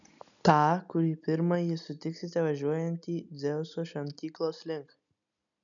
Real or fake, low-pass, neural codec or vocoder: real; 7.2 kHz; none